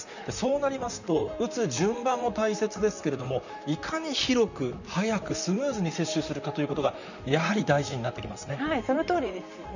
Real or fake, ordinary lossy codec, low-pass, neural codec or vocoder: fake; none; 7.2 kHz; vocoder, 44.1 kHz, 128 mel bands, Pupu-Vocoder